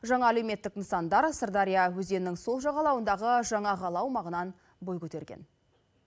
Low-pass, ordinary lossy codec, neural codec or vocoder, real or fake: none; none; none; real